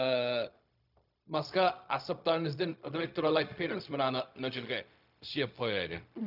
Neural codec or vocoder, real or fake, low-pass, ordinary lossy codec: codec, 16 kHz, 0.4 kbps, LongCat-Audio-Codec; fake; 5.4 kHz; none